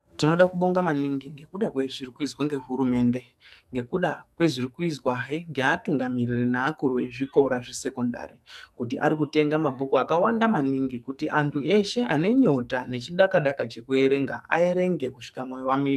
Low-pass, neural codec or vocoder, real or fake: 14.4 kHz; codec, 44.1 kHz, 2.6 kbps, SNAC; fake